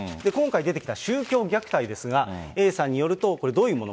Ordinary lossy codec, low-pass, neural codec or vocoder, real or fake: none; none; none; real